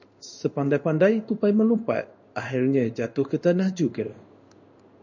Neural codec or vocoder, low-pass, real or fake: codec, 16 kHz in and 24 kHz out, 1 kbps, XY-Tokenizer; 7.2 kHz; fake